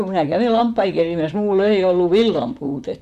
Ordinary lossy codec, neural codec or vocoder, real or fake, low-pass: none; vocoder, 44.1 kHz, 128 mel bands, Pupu-Vocoder; fake; 14.4 kHz